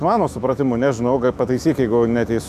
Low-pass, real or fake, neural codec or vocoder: 14.4 kHz; fake; autoencoder, 48 kHz, 128 numbers a frame, DAC-VAE, trained on Japanese speech